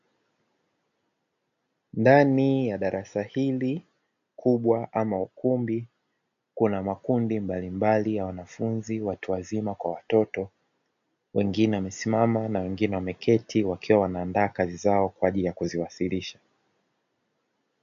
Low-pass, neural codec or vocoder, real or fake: 7.2 kHz; none; real